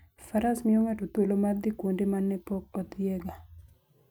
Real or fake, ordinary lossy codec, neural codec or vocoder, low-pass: real; none; none; none